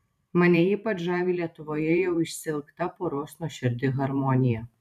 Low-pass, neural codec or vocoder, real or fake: 14.4 kHz; vocoder, 44.1 kHz, 128 mel bands every 512 samples, BigVGAN v2; fake